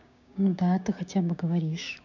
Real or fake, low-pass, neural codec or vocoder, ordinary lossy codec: fake; 7.2 kHz; codec, 16 kHz, 6 kbps, DAC; none